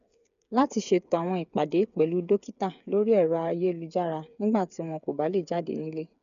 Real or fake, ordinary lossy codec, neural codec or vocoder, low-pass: fake; none; codec, 16 kHz, 8 kbps, FreqCodec, smaller model; 7.2 kHz